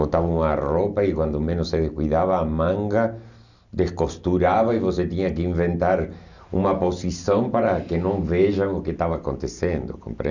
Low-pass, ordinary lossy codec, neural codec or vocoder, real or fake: 7.2 kHz; none; none; real